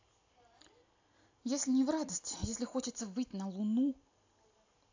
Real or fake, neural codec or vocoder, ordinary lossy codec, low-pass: real; none; AAC, 48 kbps; 7.2 kHz